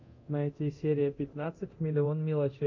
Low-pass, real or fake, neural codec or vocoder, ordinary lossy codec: 7.2 kHz; fake; codec, 24 kHz, 0.9 kbps, DualCodec; MP3, 64 kbps